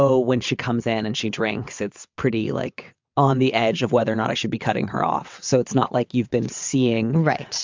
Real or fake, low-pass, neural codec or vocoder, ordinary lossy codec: fake; 7.2 kHz; vocoder, 22.05 kHz, 80 mel bands, WaveNeXt; MP3, 64 kbps